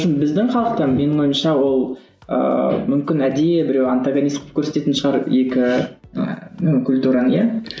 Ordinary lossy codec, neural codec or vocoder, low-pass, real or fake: none; none; none; real